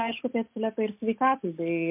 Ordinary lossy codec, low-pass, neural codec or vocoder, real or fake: MP3, 32 kbps; 3.6 kHz; none; real